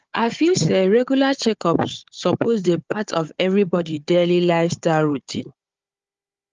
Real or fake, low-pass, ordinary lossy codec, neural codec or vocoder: fake; 7.2 kHz; Opus, 32 kbps; codec, 16 kHz, 4 kbps, FunCodec, trained on Chinese and English, 50 frames a second